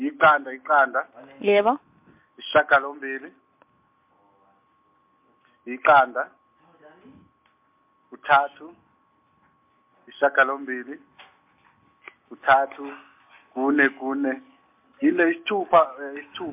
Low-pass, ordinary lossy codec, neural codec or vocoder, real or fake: 3.6 kHz; MP3, 32 kbps; none; real